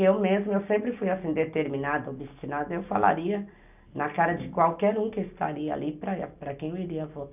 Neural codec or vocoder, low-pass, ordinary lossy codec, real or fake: none; 3.6 kHz; none; real